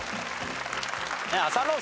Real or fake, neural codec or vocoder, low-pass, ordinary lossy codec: real; none; none; none